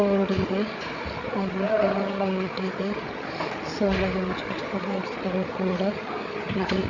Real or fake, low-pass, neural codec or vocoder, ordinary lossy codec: fake; 7.2 kHz; codec, 16 kHz, 8 kbps, FreqCodec, larger model; none